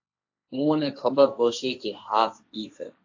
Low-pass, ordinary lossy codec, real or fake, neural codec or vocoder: 7.2 kHz; AAC, 48 kbps; fake; codec, 16 kHz, 1.1 kbps, Voila-Tokenizer